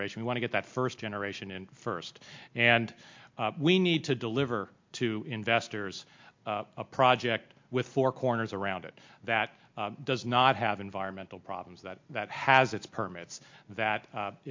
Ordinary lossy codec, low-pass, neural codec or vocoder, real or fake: MP3, 48 kbps; 7.2 kHz; none; real